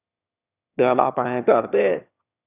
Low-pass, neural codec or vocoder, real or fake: 3.6 kHz; autoencoder, 22.05 kHz, a latent of 192 numbers a frame, VITS, trained on one speaker; fake